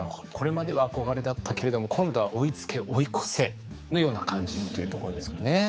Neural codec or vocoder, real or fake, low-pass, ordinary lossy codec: codec, 16 kHz, 4 kbps, X-Codec, HuBERT features, trained on general audio; fake; none; none